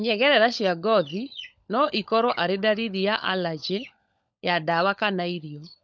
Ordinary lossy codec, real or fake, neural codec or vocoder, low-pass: none; fake; codec, 16 kHz, 16 kbps, FunCodec, trained on LibriTTS, 50 frames a second; none